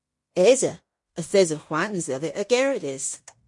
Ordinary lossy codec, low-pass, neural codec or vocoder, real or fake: MP3, 48 kbps; 10.8 kHz; codec, 16 kHz in and 24 kHz out, 0.9 kbps, LongCat-Audio-Codec, fine tuned four codebook decoder; fake